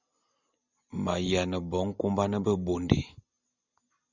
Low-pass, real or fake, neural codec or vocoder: 7.2 kHz; real; none